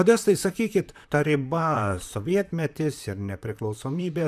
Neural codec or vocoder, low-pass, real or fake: vocoder, 44.1 kHz, 128 mel bands, Pupu-Vocoder; 14.4 kHz; fake